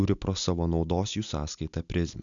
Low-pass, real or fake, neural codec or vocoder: 7.2 kHz; real; none